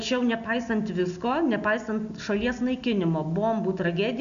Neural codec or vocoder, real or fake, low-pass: none; real; 7.2 kHz